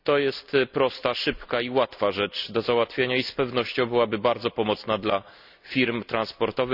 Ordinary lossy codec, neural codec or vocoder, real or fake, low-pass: none; none; real; 5.4 kHz